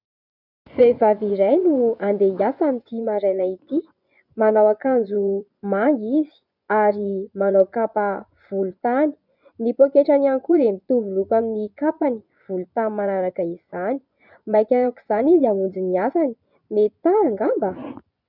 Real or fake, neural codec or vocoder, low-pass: fake; vocoder, 24 kHz, 100 mel bands, Vocos; 5.4 kHz